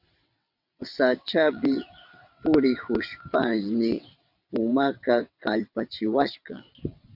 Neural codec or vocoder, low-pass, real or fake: vocoder, 22.05 kHz, 80 mel bands, WaveNeXt; 5.4 kHz; fake